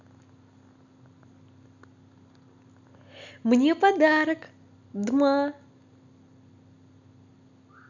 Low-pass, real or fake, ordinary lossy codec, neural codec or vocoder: 7.2 kHz; real; none; none